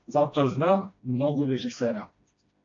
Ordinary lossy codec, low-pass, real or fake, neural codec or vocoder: AAC, 48 kbps; 7.2 kHz; fake; codec, 16 kHz, 1 kbps, FreqCodec, smaller model